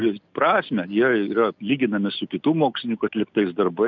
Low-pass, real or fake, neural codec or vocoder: 7.2 kHz; real; none